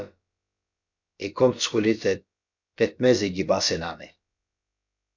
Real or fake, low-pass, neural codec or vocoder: fake; 7.2 kHz; codec, 16 kHz, about 1 kbps, DyCAST, with the encoder's durations